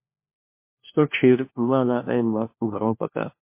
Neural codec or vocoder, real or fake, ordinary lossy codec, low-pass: codec, 16 kHz, 1 kbps, FunCodec, trained on LibriTTS, 50 frames a second; fake; MP3, 24 kbps; 3.6 kHz